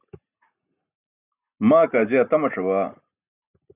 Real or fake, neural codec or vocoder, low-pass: real; none; 3.6 kHz